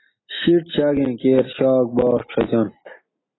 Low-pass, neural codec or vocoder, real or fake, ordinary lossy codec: 7.2 kHz; none; real; AAC, 16 kbps